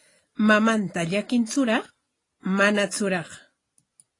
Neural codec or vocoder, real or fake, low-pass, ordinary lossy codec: none; real; 10.8 kHz; AAC, 32 kbps